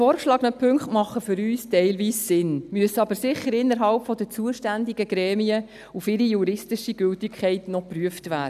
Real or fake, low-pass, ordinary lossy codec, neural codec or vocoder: real; 14.4 kHz; none; none